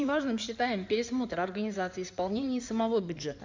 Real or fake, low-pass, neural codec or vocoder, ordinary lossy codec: fake; 7.2 kHz; codec, 16 kHz in and 24 kHz out, 2.2 kbps, FireRedTTS-2 codec; MP3, 64 kbps